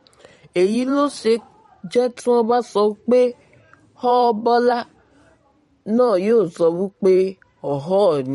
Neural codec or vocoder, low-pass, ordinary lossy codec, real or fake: vocoder, 44.1 kHz, 128 mel bands every 512 samples, BigVGAN v2; 19.8 kHz; MP3, 48 kbps; fake